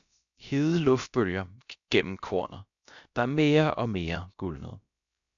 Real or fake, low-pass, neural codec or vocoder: fake; 7.2 kHz; codec, 16 kHz, about 1 kbps, DyCAST, with the encoder's durations